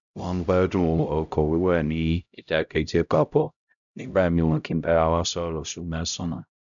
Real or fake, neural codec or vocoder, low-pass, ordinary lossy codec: fake; codec, 16 kHz, 0.5 kbps, X-Codec, HuBERT features, trained on LibriSpeech; 7.2 kHz; none